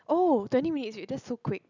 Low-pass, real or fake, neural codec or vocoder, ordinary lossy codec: 7.2 kHz; real; none; none